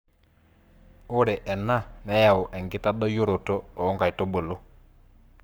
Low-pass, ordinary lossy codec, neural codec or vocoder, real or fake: none; none; codec, 44.1 kHz, 7.8 kbps, Pupu-Codec; fake